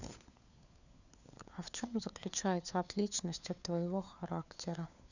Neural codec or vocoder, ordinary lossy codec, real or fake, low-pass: codec, 16 kHz, 4 kbps, FunCodec, trained on LibriTTS, 50 frames a second; none; fake; 7.2 kHz